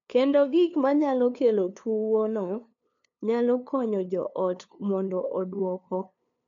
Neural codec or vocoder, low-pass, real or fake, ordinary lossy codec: codec, 16 kHz, 2 kbps, FunCodec, trained on LibriTTS, 25 frames a second; 7.2 kHz; fake; MP3, 64 kbps